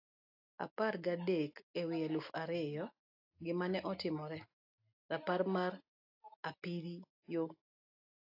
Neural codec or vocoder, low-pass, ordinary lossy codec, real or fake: none; 5.4 kHz; AAC, 48 kbps; real